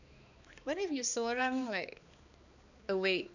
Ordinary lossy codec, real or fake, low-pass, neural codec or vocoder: none; fake; 7.2 kHz; codec, 16 kHz, 2 kbps, X-Codec, HuBERT features, trained on balanced general audio